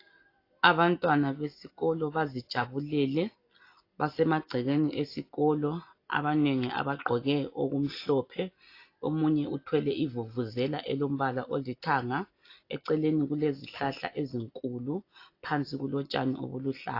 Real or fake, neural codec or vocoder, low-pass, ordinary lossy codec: real; none; 5.4 kHz; AAC, 32 kbps